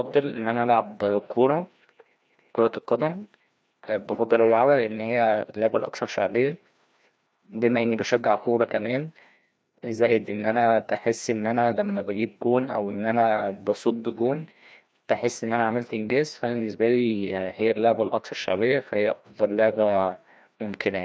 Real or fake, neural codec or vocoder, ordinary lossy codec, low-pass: fake; codec, 16 kHz, 1 kbps, FreqCodec, larger model; none; none